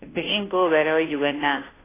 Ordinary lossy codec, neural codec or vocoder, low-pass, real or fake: AAC, 16 kbps; codec, 16 kHz, 0.5 kbps, FunCodec, trained on Chinese and English, 25 frames a second; 3.6 kHz; fake